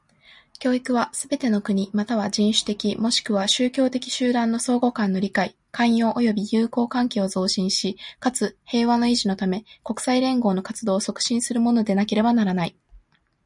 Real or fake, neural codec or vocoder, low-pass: real; none; 10.8 kHz